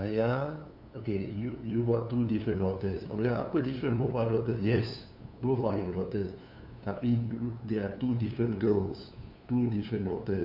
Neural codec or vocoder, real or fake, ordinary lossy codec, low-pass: codec, 16 kHz, 2 kbps, FunCodec, trained on LibriTTS, 25 frames a second; fake; none; 5.4 kHz